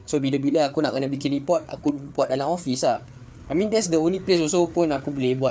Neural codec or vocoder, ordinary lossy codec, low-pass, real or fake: codec, 16 kHz, 4 kbps, FreqCodec, larger model; none; none; fake